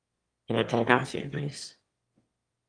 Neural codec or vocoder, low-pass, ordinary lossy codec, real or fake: autoencoder, 22.05 kHz, a latent of 192 numbers a frame, VITS, trained on one speaker; 9.9 kHz; Opus, 32 kbps; fake